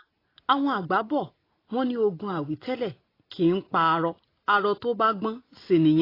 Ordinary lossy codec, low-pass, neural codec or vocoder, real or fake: AAC, 24 kbps; 5.4 kHz; none; real